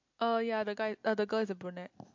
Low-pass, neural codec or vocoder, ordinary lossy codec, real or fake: 7.2 kHz; none; MP3, 48 kbps; real